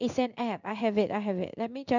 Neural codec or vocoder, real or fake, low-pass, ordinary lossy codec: codec, 16 kHz in and 24 kHz out, 1 kbps, XY-Tokenizer; fake; 7.2 kHz; none